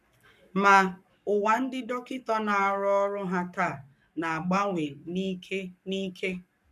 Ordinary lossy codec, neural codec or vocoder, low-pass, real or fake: none; codec, 44.1 kHz, 7.8 kbps, Pupu-Codec; 14.4 kHz; fake